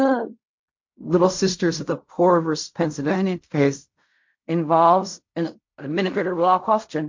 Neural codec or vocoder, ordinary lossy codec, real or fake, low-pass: codec, 16 kHz in and 24 kHz out, 0.4 kbps, LongCat-Audio-Codec, fine tuned four codebook decoder; MP3, 48 kbps; fake; 7.2 kHz